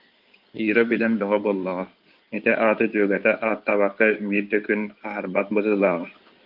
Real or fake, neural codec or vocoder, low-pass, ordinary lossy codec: fake; codec, 16 kHz in and 24 kHz out, 2.2 kbps, FireRedTTS-2 codec; 5.4 kHz; Opus, 32 kbps